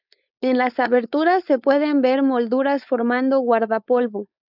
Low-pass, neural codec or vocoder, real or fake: 5.4 kHz; codec, 16 kHz, 4.8 kbps, FACodec; fake